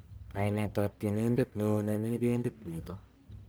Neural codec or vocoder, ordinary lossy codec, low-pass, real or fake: codec, 44.1 kHz, 1.7 kbps, Pupu-Codec; none; none; fake